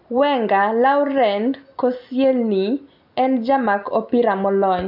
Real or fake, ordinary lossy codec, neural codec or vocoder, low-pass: real; none; none; 5.4 kHz